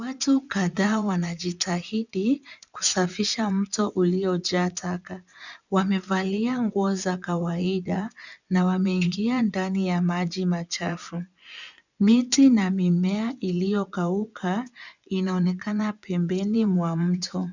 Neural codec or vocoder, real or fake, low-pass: vocoder, 24 kHz, 100 mel bands, Vocos; fake; 7.2 kHz